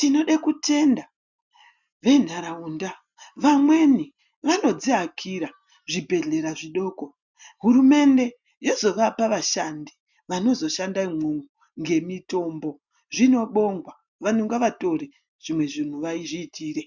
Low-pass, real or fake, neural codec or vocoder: 7.2 kHz; real; none